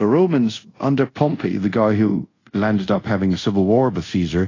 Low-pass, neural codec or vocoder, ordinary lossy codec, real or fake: 7.2 kHz; codec, 24 kHz, 0.5 kbps, DualCodec; AAC, 32 kbps; fake